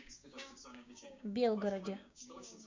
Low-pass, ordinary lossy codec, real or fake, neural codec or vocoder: 7.2 kHz; MP3, 64 kbps; fake; codec, 16 kHz, 6 kbps, DAC